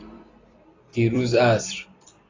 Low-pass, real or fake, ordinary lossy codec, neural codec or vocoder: 7.2 kHz; fake; AAC, 32 kbps; vocoder, 44.1 kHz, 128 mel bands every 256 samples, BigVGAN v2